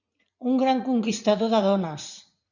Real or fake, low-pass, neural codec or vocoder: fake; 7.2 kHz; vocoder, 44.1 kHz, 80 mel bands, Vocos